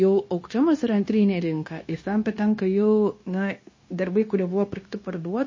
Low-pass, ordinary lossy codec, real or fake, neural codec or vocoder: 7.2 kHz; MP3, 32 kbps; fake; codec, 16 kHz, 0.9 kbps, LongCat-Audio-Codec